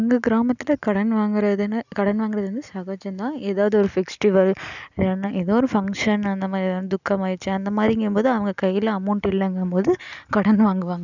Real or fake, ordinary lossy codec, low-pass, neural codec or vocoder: real; none; 7.2 kHz; none